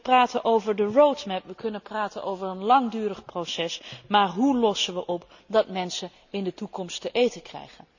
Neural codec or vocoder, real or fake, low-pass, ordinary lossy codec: none; real; 7.2 kHz; none